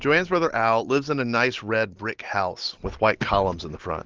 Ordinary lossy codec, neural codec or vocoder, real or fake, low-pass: Opus, 16 kbps; none; real; 7.2 kHz